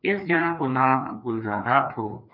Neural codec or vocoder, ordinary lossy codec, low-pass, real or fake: codec, 16 kHz, 2 kbps, FreqCodec, larger model; MP3, 48 kbps; 5.4 kHz; fake